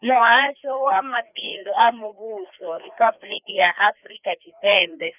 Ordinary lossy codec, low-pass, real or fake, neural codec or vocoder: none; 3.6 kHz; fake; codec, 16 kHz, 2 kbps, FreqCodec, larger model